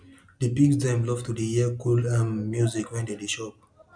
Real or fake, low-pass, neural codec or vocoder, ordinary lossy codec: real; 9.9 kHz; none; none